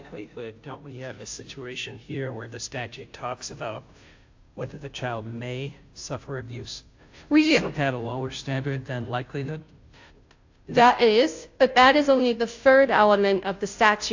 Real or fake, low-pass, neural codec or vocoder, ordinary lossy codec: fake; 7.2 kHz; codec, 16 kHz, 0.5 kbps, FunCodec, trained on Chinese and English, 25 frames a second; AAC, 48 kbps